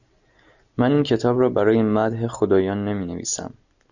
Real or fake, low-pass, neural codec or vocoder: real; 7.2 kHz; none